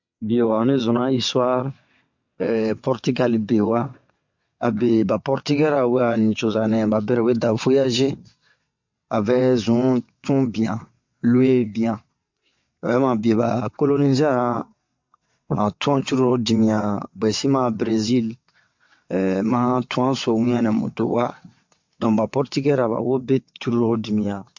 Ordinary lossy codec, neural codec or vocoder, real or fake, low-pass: MP3, 48 kbps; vocoder, 22.05 kHz, 80 mel bands, WaveNeXt; fake; 7.2 kHz